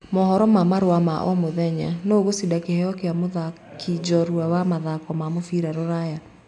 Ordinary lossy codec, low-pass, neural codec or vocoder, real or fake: none; 10.8 kHz; none; real